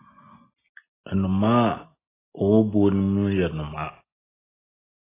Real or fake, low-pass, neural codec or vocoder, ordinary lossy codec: real; 3.6 kHz; none; AAC, 16 kbps